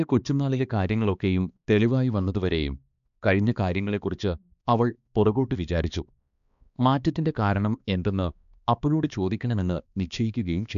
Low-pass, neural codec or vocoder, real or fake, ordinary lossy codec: 7.2 kHz; codec, 16 kHz, 2 kbps, X-Codec, HuBERT features, trained on balanced general audio; fake; MP3, 96 kbps